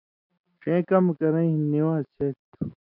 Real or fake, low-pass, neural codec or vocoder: real; 5.4 kHz; none